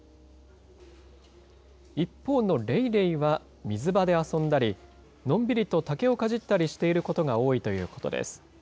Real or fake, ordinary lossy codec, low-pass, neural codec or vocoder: real; none; none; none